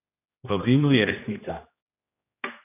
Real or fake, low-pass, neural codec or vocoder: fake; 3.6 kHz; codec, 16 kHz, 1 kbps, X-Codec, HuBERT features, trained on general audio